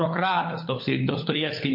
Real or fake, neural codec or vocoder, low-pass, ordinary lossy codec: fake; codec, 16 kHz, 16 kbps, FunCodec, trained on LibriTTS, 50 frames a second; 5.4 kHz; MP3, 32 kbps